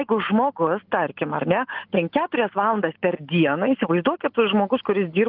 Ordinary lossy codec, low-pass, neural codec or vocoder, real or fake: Opus, 32 kbps; 5.4 kHz; none; real